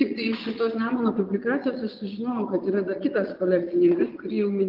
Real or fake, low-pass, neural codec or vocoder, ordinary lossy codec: fake; 5.4 kHz; vocoder, 22.05 kHz, 80 mel bands, Vocos; Opus, 24 kbps